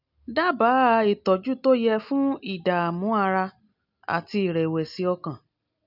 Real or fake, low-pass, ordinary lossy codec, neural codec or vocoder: real; 5.4 kHz; none; none